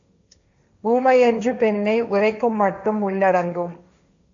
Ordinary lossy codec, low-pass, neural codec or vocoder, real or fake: AAC, 64 kbps; 7.2 kHz; codec, 16 kHz, 1.1 kbps, Voila-Tokenizer; fake